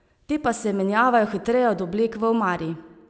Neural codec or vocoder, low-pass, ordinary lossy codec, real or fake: none; none; none; real